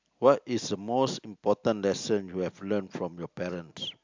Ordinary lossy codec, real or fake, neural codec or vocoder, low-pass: MP3, 64 kbps; real; none; 7.2 kHz